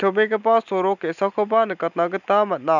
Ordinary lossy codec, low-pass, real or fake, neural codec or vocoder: none; 7.2 kHz; real; none